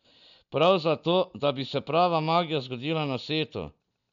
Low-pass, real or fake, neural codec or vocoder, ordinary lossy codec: 7.2 kHz; real; none; none